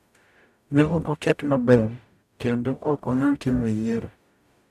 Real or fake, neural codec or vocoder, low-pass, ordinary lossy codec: fake; codec, 44.1 kHz, 0.9 kbps, DAC; 14.4 kHz; none